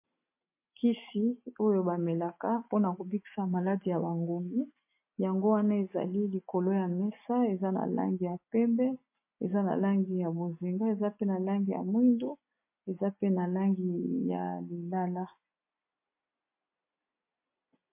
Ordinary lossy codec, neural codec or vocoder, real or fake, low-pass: MP3, 24 kbps; none; real; 3.6 kHz